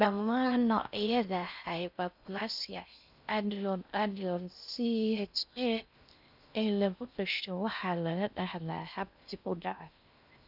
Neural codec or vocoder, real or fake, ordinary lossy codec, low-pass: codec, 16 kHz in and 24 kHz out, 0.6 kbps, FocalCodec, streaming, 4096 codes; fake; none; 5.4 kHz